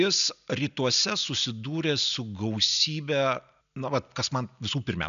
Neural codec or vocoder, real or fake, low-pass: none; real; 7.2 kHz